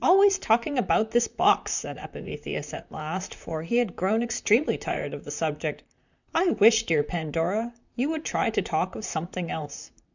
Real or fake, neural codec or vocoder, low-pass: fake; vocoder, 44.1 kHz, 128 mel bands, Pupu-Vocoder; 7.2 kHz